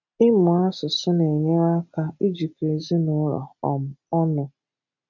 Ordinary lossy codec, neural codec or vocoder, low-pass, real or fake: none; none; 7.2 kHz; real